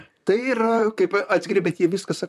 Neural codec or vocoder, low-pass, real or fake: vocoder, 44.1 kHz, 128 mel bands, Pupu-Vocoder; 14.4 kHz; fake